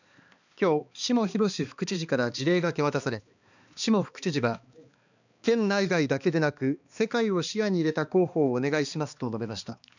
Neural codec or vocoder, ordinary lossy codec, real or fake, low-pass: codec, 16 kHz, 2 kbps, X-Codec, HuBERT features, trained on balanced general audio; none; fake; 7.2 kHz